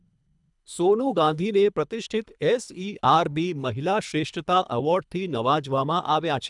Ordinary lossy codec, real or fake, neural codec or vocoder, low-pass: none; fake; codec, 24 kHz, 3 kbps, HILCodec; 10.8 kHz